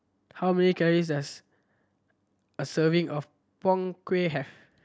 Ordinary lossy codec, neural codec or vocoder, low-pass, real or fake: none; none; none; real